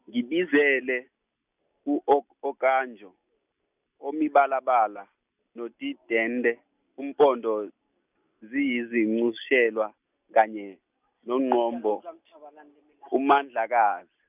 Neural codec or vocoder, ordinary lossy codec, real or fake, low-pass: none; none; real; 3.6 kHz